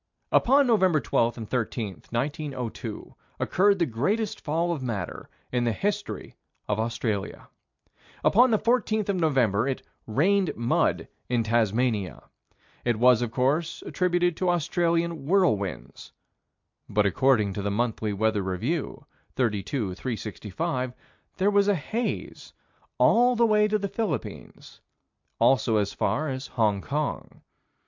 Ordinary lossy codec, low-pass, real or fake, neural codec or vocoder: MP3, 64 kbps; 7.2 kHz; real; none